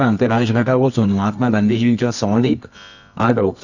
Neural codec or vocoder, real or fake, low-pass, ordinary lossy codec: codec, 24 kHz, 0.9 kbps, WavTokenizer, medium music audio release; fake; 7.2 kHz; none